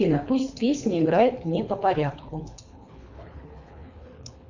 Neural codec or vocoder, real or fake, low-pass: codec, 24 kHz, 3 kbps, HILCodec; fake; 7.2 kHz